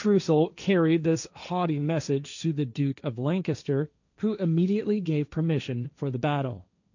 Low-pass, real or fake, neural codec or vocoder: 7.2 kHz; fake; codec, 16 kHz, 1.1 kbps, Voila-Tokenizer